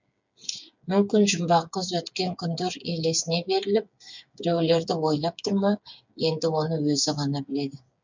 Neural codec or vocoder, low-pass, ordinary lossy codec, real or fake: codec, 16 kHz, 8 kbps, FreqCodec, smaller model; 7.2 kHz; MP3, 64 kbps; fake